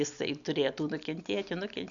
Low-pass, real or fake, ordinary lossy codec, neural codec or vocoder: 7.2 kHz; real; MP3, 96 kbps; none